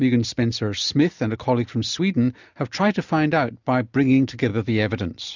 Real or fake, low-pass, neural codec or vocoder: real; 7.2 kHz; none